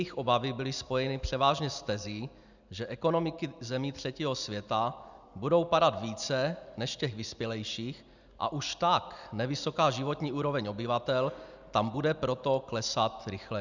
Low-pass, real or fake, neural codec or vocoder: 7.2 kHz; real; none